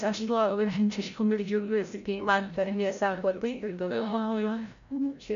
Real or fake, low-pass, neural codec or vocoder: fake; 7.2 kHz; codec, 16 kHz, 0.5 kbps, FreqCodec, larger model